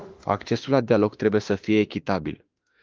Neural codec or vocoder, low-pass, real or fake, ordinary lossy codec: autoencoder, 48 kHz, 32 numbers a frame, DAC-VAE, trained on Japanese speech; 7.2 kHz; fake; Opus, 32 kbps